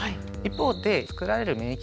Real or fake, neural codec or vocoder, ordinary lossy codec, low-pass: real; none; none; none